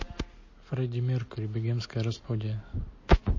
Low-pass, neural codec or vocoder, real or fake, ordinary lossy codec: 7.2 kHz; none; real; MP3, 32 kbps